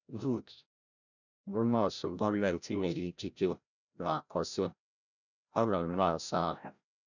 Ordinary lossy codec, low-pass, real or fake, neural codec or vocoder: none; 7.2 kHz; fake; codec, 16 kHz, 0.5 kbps, FreqCodec, larger model